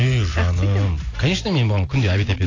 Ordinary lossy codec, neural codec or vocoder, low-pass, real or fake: AAC, 32 kbps; none; 7.2 kHz; real